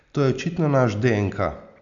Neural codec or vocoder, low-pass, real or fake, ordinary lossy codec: none; 7.2 kHz; real; none